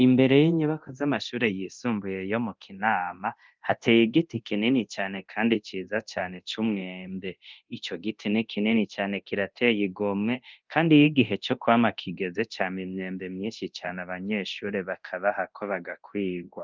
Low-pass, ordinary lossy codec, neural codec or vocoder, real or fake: 7.2 kHz; Opus, 24 kbps; codec, 24 kHz, 0.9 kbps, DualCodec; fake